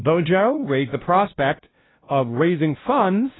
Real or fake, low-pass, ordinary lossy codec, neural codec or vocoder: fake; 7.2 kHz; AAC, 16 kbps; codec, 16 kHz, 0.5 kbps, FunCodec, trained on LibriTTS, 25 frames a second